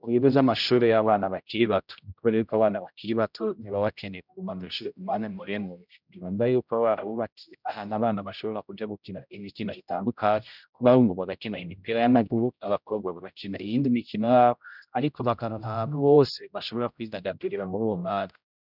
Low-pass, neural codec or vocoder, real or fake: 5.4 kHz; codec, 16 kHz, 0.5 kbps, X-Codec, HuBERT features, trained on general audio; fake